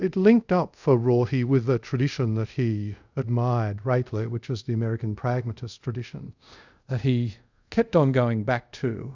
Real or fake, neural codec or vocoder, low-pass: fake; codec, 24 kHz, 0.5 kbps, DualCodec; 7.2 kHz